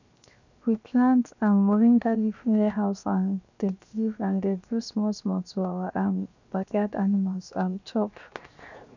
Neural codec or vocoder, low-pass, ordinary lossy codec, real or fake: codec, 16 kHz, 0.7 kbps, FocalCodec; 7.2 kHz; none; fake